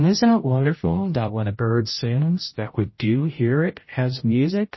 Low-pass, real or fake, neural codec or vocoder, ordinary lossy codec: 7.2 kHz; fake; codec, 16 kHz, 0.5 kbps, X-Codec, HuBERT features, trained on general audio; MP3, 24 kbps